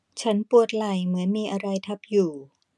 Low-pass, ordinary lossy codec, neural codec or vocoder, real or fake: none; none; none; real